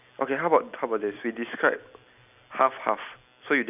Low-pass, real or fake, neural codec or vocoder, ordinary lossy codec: 3.6 kHz; real; none; none